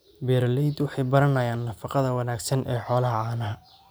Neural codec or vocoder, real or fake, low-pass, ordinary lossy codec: none; real; none; none